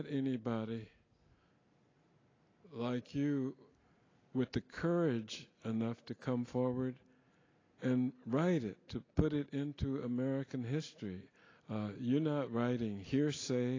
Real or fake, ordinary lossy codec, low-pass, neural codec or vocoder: real; AAC, 32 kbps; 7.2 kHz; none